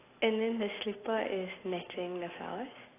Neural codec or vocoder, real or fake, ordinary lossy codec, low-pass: none; real; AAC, 16 kbps; 3.6 kHz